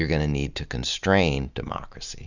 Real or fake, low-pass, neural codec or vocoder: real; 7.2 kHz; none